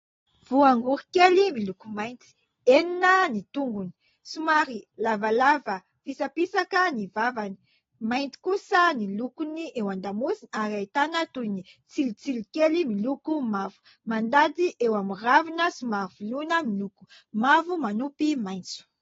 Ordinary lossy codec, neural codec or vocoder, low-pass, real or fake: AAC, 24 kbps; none; 7.2 kHz; real